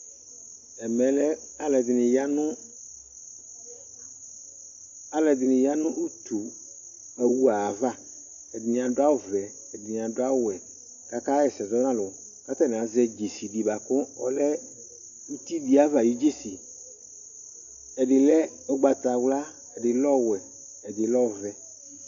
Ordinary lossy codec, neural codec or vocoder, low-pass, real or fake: AAC, 64 kbps; none; 7.2 kHz; real